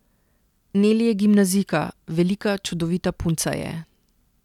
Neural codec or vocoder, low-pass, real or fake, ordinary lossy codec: none; 19.8 kHz; real; none